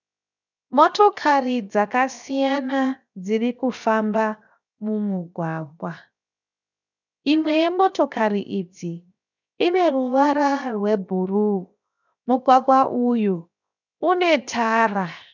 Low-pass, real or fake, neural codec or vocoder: 7.2 kHz; fake; codec, 16 kHz, 0.7 kbps, FocalCodec